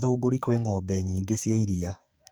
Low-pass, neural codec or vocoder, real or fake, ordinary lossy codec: none; codec, 44.1 kHz, 2.6 kbps, SNAC; fake; none